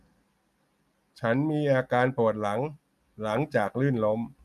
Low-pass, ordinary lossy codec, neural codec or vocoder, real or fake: 14.4 kHz; none; none; real